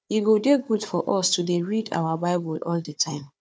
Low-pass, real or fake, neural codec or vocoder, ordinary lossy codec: none; fake; codec, 16 kHz, 4 kbps, FunCodec, trained on Chinese and English, 50 frames a second; none